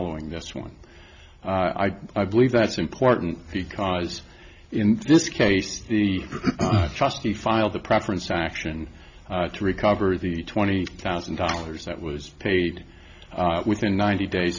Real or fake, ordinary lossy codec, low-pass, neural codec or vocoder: real; Opus, 64 kbps; 7.2 kHz; none